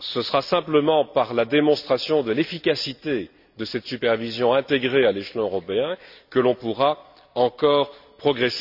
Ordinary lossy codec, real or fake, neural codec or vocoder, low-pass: none; real; none; 5.4 kHz